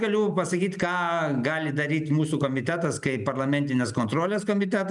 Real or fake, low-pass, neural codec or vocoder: real; 10.8 kHz; none